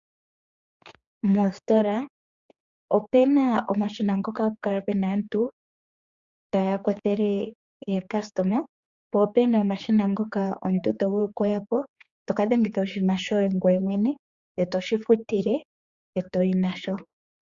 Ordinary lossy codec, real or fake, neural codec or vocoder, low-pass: Opus, 64 kbps; fake; codec, 16 kHz, 4 kbps, X-Codec, HuBERT features, trained on general audio; 7.2 kHz